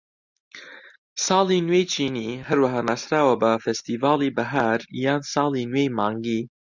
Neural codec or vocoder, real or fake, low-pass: none; real; 7.2 kHz